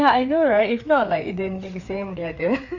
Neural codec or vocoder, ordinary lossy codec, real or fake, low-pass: codec, 16 kHz, 4 kbps, FreqCodec, larger model; none; fake; 7.2 kHz